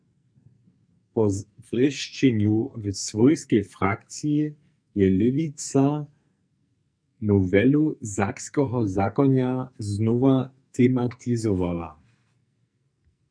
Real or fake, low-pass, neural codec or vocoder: fake; 9.9 kHz; codec, 44.1 kHz, 2.6 kbps, SNAC